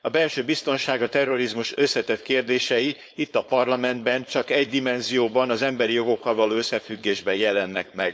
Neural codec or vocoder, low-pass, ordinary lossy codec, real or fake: codec, 16 kHz, 4.8 kbps, FACodec; none; none; fake